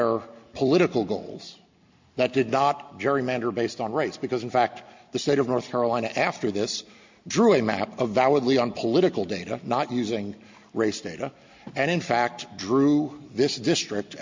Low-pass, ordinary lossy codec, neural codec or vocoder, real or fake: 7.2 kHz; MP3, 48 kbps; none; real